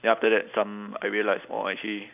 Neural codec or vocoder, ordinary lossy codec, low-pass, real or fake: none; none; 3.6 kHz; real